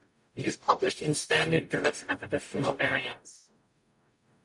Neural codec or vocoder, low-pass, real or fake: codec, 44.1 kHz, 0.9 kbps, DAC; 10.8 kHz; fake